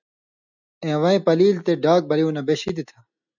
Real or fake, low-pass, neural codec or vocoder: real; 7.2 kHz; none